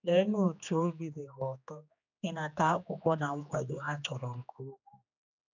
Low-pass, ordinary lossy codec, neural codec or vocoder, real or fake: 7.2 kHz; none; codec, 16 kHz, 2 kbps, X-Codec, HuBERT features, trained on general audio; fake